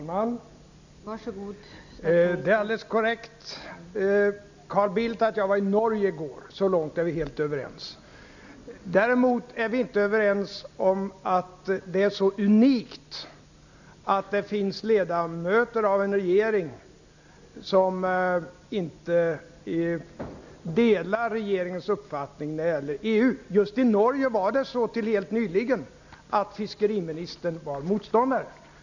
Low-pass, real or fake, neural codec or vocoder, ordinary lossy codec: 7.2 kHz; real; none; none